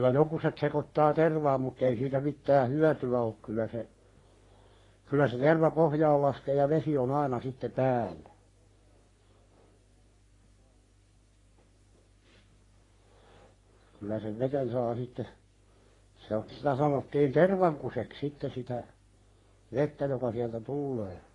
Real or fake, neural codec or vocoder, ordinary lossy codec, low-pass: fake; codec, 44.1 kHz, 3.4 kbps, Pupu-Codec; AAC, 32 kbps; 10.8 kHz